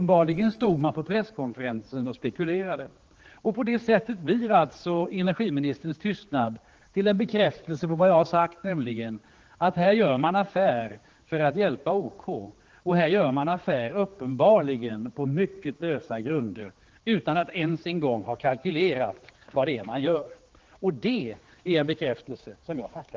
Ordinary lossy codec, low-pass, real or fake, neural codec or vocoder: Opus, 16 kbps; 7.2 kHz; fake; codec, 16 kHz, 4 kbps, X-Codec, HuBERT features, trained on general audio